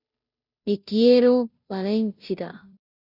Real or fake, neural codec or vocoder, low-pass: fake; codec, 16 kHz, 0.5 kbps, FunCodec, trained on Chinese and English, 25 frames a second; 5.4 kHz